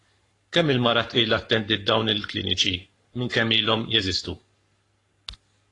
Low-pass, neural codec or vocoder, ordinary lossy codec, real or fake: 10.8 kHz; codec, 44.1 kHz, 7.8 kbps, Pupu-Codec; AAC, 32 kbps; fake